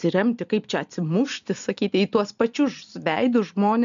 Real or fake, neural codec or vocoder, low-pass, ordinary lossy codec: real; none; 7.2 kHz; AAC, 96 kbps